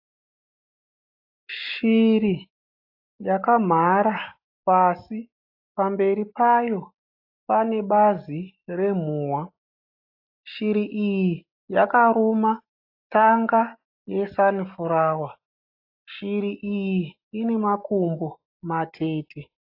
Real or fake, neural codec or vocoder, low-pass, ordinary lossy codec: real; none; 5.4 kHz; AAC, 32 kbps